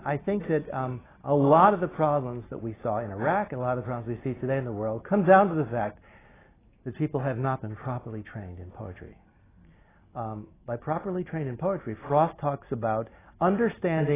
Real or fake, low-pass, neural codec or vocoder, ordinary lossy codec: real; 3.6 kHz; none; AAC, 16 kbps